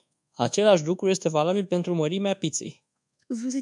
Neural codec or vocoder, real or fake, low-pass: codec, 24 kHz, 1.2 kbps, DualCodec; fake; 10.8 kHz